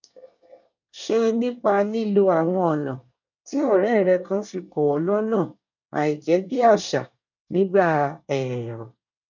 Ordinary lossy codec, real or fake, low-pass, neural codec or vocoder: none; fake; 7.2 kHz; codec, 24 kHz, 1 kbps, SNAC